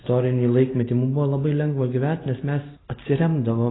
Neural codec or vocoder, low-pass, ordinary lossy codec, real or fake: none; 7.2 kHz; AAC, 16 kbps; real